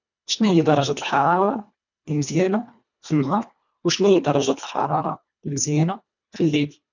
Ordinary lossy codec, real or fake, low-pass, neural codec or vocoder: none; fake; 7.2 kHz; codec, 24 kHz, 1.5 kbps, HILCodec